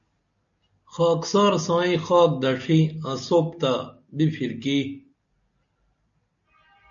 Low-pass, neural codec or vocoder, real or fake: 7.2 kHz; none; real